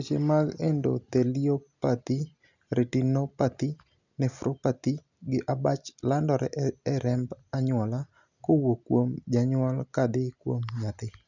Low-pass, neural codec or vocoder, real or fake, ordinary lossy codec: 7.2 kHz; none; real; none